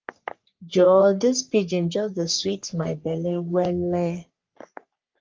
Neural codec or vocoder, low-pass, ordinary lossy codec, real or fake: codec, 44.1 kHz, 3.4 kbps, Pupu-Codec; 7.2 kHz; Opus, 24 kbps; fake